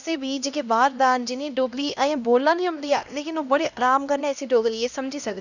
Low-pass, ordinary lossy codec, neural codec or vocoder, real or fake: 7.2 kHz; none; codec, 16 kHz, 1 kbps, X-Codec, HuBERT features, trained on LibriSpeech; fake